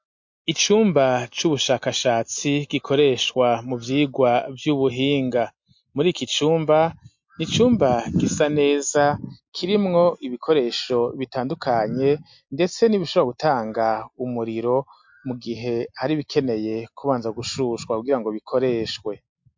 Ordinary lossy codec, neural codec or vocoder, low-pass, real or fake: MP3, 48 kbps; none; 7.2 kHz; real